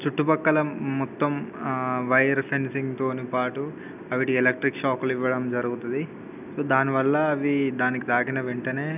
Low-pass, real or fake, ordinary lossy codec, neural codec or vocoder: 3.6 kHz; real; none; none